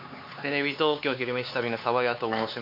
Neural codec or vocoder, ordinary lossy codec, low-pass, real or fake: codec, 16 kHz, 4 kbps, X-Codec, HuBERT features, trained on LibriSpeech; MP3, 32 kbps; 5.4 kHz; fake